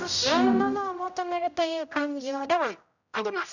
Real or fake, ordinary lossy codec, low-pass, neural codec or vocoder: fake; none; 7.2 kHz; codec, 16 kHz, 0.5 kbps, X-Codec, HuBERT features, trained on general audio